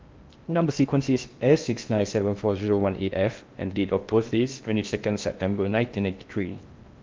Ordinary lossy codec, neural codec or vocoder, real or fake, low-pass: Opus, 24 kbps; codec, 16 kHz in and 24 kHz out, 0.6 kbps, FocalCodec, streaming, 2048 codes; fake; 7.2 kHz